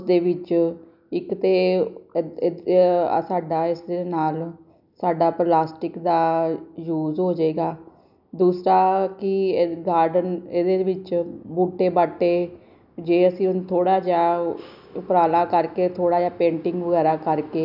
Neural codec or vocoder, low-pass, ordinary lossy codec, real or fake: none; 5.4 kHz; none; real